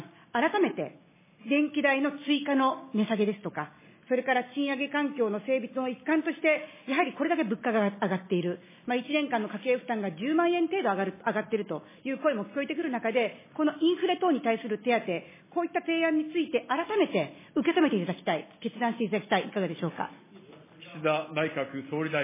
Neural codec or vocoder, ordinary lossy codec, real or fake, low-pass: none; MP3, 16 kbps; real; 3.6 kHz